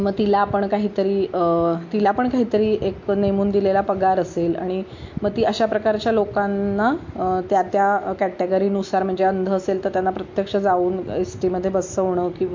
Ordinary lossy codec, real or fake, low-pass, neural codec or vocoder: MP3, 48 kbps; real; 7.2 kHz; none